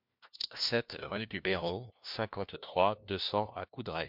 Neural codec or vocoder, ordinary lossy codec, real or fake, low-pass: codec, 16 kHz, 1 kbps, FunCodec, trained on LibriTTS, 50 frames a second; MP3, 48 kbps; fake; 5.4 kHz